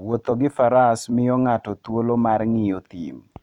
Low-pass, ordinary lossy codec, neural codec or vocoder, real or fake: 19.8 kHz; none; vocoder, 44.1 kHz, 128 mel bands every 256 samples, BigVGAN v2; fake